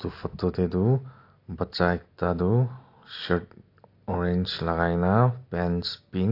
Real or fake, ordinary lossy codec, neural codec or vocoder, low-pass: real; AAC, 32 kbps; none; 5.4 kHz